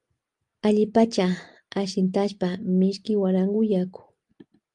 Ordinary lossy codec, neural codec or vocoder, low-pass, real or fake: Opus, 32 kbps; none; 10.8 kHz; real